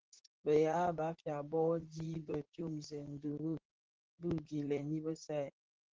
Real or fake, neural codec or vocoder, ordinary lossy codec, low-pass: fake; vocoder, 44.1 kHz, 128 mel bands, Pupu-Vocoder; Opus, 16 kbps; 7.2 kHz